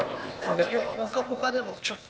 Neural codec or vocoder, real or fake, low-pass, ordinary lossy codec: codec, 16 kHz, 0.8 kbps, ZipCodec; fake; none; none